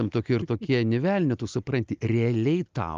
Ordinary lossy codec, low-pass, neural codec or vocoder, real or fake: Opus, 24 kbps; 7.2 kHz; none; real